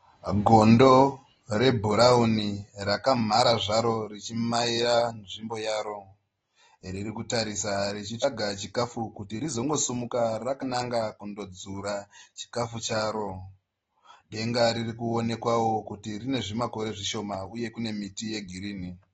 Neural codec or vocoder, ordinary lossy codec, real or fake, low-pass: none; AAC, 24 kbps; real; 19.8 kHz